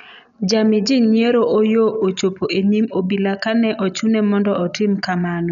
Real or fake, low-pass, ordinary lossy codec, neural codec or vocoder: real; 7.2 kHz; none; none